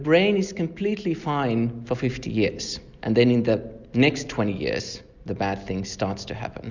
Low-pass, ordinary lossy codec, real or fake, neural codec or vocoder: 7.2 kHz; Opus, 64 kbps; real; none